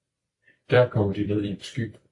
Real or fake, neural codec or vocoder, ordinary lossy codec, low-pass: fake; vocoder, 44.1 kHz, 128 mel bands every 256 samples, BigVGAN v2; AAC, 48 kbps; 10.8 kHz